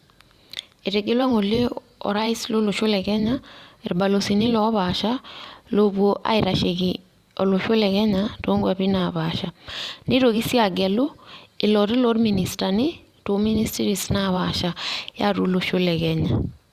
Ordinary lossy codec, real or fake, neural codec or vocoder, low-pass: Opus, 64 kbps; fake; vocoder, 44.1 kHz, 128 mel bands every 512 samples, BigVGAN v2; 14.4 kHz